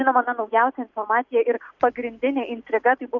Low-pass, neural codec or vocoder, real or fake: 7.2 kHz; none; real